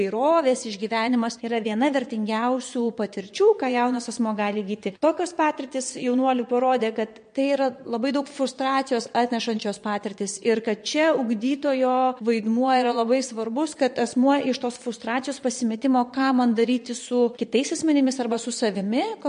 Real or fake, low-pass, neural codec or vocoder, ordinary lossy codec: fake; 9.9 kHz; vocoder, 22.05 kHz, 80 mel bands, Vocos; MP3, 48 kbps